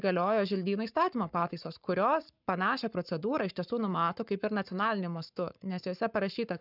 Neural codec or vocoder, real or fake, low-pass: codec, 44.1 kHz, 7.8 kbps, Pupu-Codec; fake; 5.4 kHz